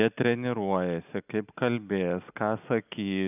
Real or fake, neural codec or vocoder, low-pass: real; none; 3.6 kHz